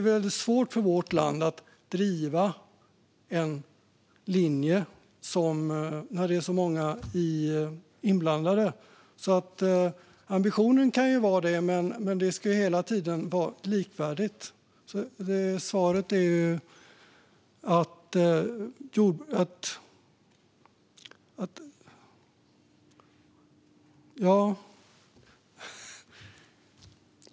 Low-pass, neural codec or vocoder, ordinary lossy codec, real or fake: none; none; none; real